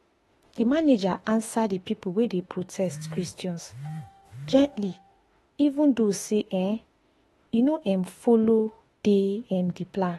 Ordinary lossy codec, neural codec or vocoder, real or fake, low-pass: AAC, 32 kbps; autoencoder, 48 kHz, 32 numbers a frame, DAC-VAE, trained on Japanese speech; fake; 19.8 kHz